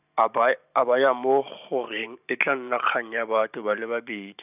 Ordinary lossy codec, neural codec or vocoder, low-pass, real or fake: none; vocoder, 44.1 kHz, 128 mel bands every 512 samples, BigVGAN v2; 3.6 kHz; fake